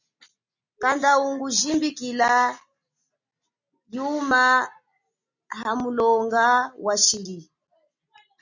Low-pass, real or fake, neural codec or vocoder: 7.2 kHz; real; none